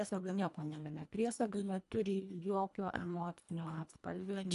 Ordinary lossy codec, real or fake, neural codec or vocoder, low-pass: AAC, 96 kbps; fake; codec, 24 kHz, 1.5 kbps, HILCodec; 10.8 kHz